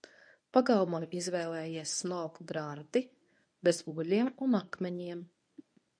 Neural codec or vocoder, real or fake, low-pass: codec, 24 kHz, 0.9 kbps, WavTokenizer, medium speech release version 1; fake; 9.9 kHz